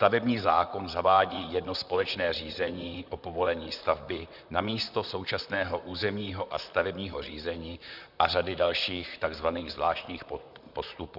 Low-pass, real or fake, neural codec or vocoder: 5.4 kHz; fake; vocoder, 44.1 kHz, 128 mel bands, Pupu-Vocoder